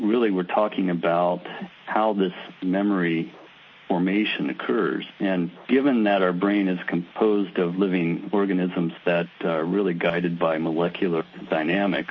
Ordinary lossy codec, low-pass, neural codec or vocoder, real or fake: MP3, 32 kbps; 7.2 kHz; none; real